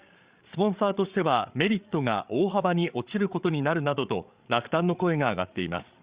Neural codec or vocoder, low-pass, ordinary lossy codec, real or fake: codec, 16 kHz, 8 kbps, FreqCodec, larger model; 3.6 kHz; Opus, 64 kbps; fake